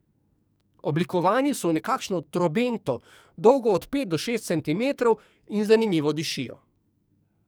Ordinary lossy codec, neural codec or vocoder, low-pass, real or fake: none; codec, 44.1 kHz, 2.6 kbps, SNAC; none; fake